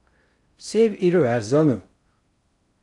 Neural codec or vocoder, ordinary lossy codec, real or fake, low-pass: codec, 16 kHz in and 24 kHz out, 0.6 kbps, FocalCodec, streaming, 4096 codes; AAC, 64 kbps; fake; 10.8 kHz